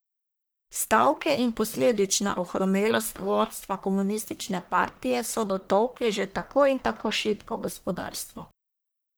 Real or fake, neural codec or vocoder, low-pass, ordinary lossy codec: fake; codec, 44.1 kHz, 1.7 kbps, Pupu-Codec; none; none